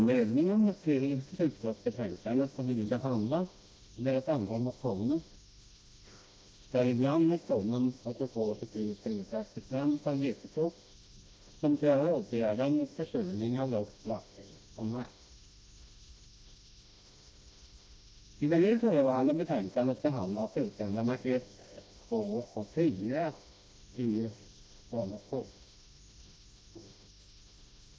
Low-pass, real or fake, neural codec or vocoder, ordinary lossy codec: none; fake; codec, 16 kHz, 1 kbps, FreqCodec, smaller model; none